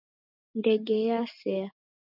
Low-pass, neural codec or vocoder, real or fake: 5.4 kHz; none; real